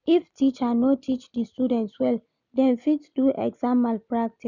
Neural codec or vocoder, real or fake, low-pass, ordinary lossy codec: none; real; 7.2 kHz; none